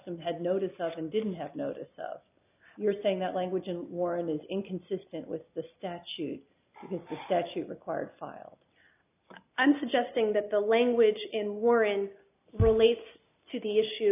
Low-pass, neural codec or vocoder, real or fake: 3.6 kHz; none; real